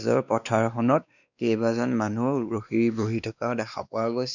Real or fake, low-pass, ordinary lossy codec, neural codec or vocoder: fake; 7.2 kHz; none; codec, 16 kHz, 2 kbps, X-Codec, WavLM features, trained on Multilingual LibriSpeech